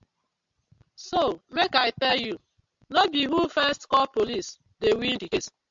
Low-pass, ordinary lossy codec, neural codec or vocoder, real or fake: 7.2 kHz; MP3, 64 kbps; none; real